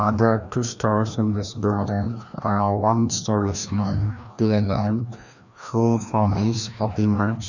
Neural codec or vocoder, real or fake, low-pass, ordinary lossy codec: codec, 16 kHz, 1 kbps, FreqCodec, larger model; fake; 7.2 kHz; none